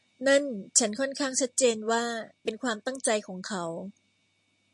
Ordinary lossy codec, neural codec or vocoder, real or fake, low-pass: MP3, 48 kbps; none; real; 10.8 kHz